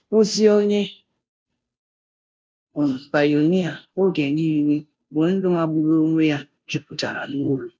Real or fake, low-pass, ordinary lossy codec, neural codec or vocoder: fake; none; none; codec, 16 kHz, 0.5 kbps, FunCodec, trained on Chinese and English, 25 frames a second